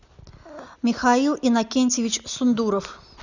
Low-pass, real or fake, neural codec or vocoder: 7.2 kHz; real; none